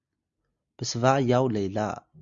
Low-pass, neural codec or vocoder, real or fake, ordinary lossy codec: 7.2 kHz; none; real; Opus, 64 kbps